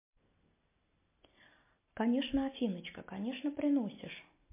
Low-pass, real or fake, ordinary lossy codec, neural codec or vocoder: 3.6 kHz; real; MP3, 24 kbps; none